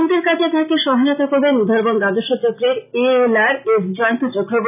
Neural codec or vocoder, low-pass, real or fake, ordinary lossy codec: none; 3.6 kHz; real; none